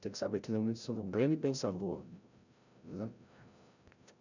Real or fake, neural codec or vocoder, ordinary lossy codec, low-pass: fake; codec, 16 kHz, 0.5 kbps, FreqCodec, larger model; none; 7.2 kHz